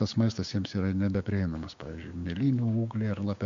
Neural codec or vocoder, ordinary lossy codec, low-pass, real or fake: codec, 16 kHz, 6 kbps, DAC; MP3, 48 kbps; 7.2 kHz; fake